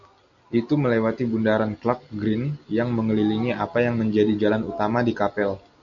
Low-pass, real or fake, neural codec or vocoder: 7.2 kHz; real; none